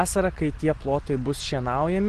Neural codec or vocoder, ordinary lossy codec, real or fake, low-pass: none; Opus, 16 kbps; real; 10.8 kHz